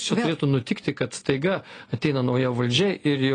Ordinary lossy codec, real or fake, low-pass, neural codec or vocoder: AAC, 32 kbps; real; 9.9 kHz; none